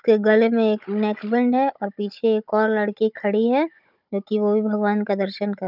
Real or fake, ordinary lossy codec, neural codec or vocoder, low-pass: fake; none; codec, 16 kHz, 16 kbps, FunCodec, trained on Chinese and English, 50 frames a second; 5.4 kHz